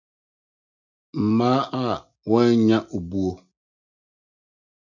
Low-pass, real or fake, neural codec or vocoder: 7.2 kHz; real; none